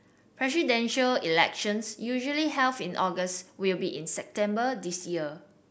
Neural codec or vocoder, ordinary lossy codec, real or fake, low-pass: none; none; real; none